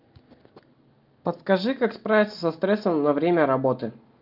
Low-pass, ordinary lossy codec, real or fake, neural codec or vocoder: 5.4 kHz; Opus, 24 kbps; real; none